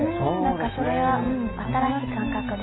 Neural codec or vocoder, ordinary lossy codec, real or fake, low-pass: none; AAC, 16 kbps; real; 7.2 kHz